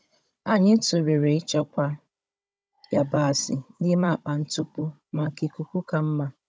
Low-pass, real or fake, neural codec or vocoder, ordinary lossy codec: none; fake; codec, 16 kHz, 16 kbps, FunCodec, trained on Chinese and English, 50 frames a second; none